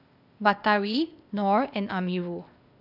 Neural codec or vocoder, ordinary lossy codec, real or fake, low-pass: codec, 16 kHz, 0.8 kbps, ZipCodec; none; fake; 5.4 kHz